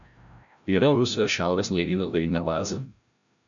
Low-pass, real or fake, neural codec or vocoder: 7.2 kHz; fake; codec, 16 kHz, 0.5 kbps, FreqCodec, larger model